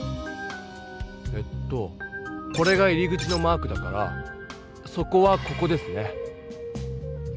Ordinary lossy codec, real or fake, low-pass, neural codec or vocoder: none; real; none; none